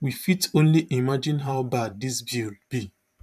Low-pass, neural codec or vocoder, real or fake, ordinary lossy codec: 14.4 kHz; vocoder, 44.1 kHz, 128 mel bands every 512 samples, BigVGAN v2; fake; none